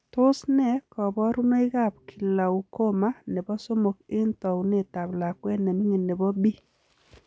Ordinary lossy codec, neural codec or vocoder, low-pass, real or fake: none; none; none; real